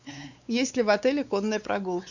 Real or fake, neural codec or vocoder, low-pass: fake; codec, 16 kHz, 4 kbps, X-Codec, WavLM features, trained on Multilingual LibriSpeech; 7.2 kHz